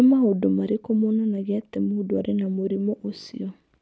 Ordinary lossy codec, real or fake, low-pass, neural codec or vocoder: none; real; none; none